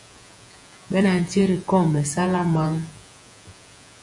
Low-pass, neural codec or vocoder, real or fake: 10.8 kHz; vocoder, 48 kHz, 128 mel bands, Vocos; fake